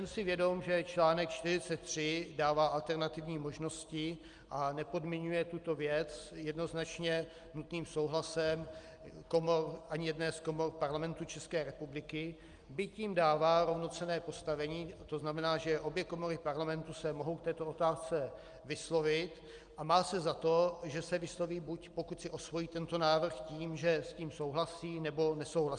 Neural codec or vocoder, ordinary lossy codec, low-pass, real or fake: none; Opus, 32 kbps; 9.9 kHz; real